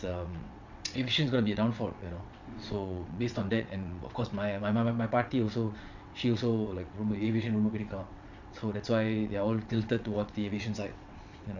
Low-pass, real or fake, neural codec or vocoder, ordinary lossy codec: 7.2 kHz; fake; vocoder, 22.05 kHz, 80 mel bands, WaveNeXt; none